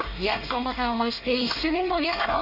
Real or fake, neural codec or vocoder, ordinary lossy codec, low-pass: fake; codec, 24 kHz, 1 kbps, SNAC; none; 5.4 kHz